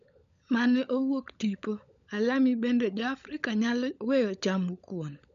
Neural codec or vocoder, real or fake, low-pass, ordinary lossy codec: codec, 16 kHz, 16 kbps, FunCodec, trained on LibriTTS, 50 frames a second; fake; 7.2 kHz; none